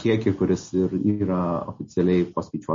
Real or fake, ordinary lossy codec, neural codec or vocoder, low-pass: real; MP3, 32 kbps; none; 7.2 kHz